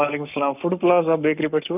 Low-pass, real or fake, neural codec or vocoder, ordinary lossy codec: 3.6 kHz; fake; codec, 44.1 kHz, 7.8 kbps, Pupu-Codec; none